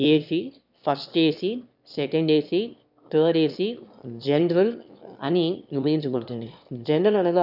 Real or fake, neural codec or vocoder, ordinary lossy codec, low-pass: fake; autoencoder, 22.05 kHz, a latent of 192 numbers a frame, VITS, trained on one speaker; none; 5.4 kHz